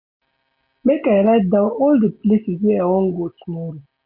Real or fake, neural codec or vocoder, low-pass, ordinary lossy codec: real; none; 5.4 kHz; none